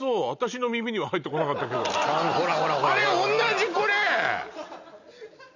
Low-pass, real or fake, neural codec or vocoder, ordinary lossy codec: 7.2 kHz; real; none; none